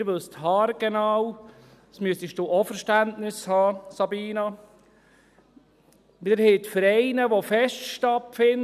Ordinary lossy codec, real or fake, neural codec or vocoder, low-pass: none; real; none; 14.4 kHz